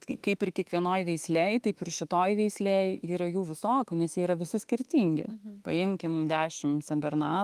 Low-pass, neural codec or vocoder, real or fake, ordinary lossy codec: 14.4 kHz; autoencoder, 48 kHz, 32 numbers a frame, DAC-VAE, trained on Japanese speech; fake; Opus, 32 kbps